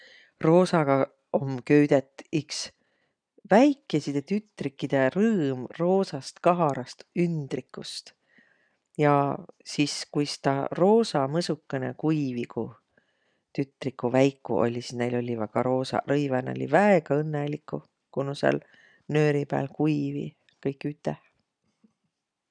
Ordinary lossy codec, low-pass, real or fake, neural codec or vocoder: none; 9.9 kHz; real; none